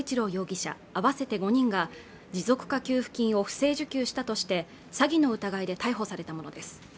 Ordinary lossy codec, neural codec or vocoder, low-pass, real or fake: none; none; none; real